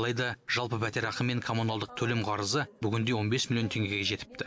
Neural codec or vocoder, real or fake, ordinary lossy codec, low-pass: none; real; none; none